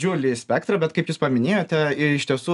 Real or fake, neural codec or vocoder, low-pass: real; none; 10.8 kHz